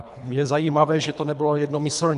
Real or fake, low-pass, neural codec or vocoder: fake; 10.8 kHz; codec, 24 kHz, 3 kbps, HILCodec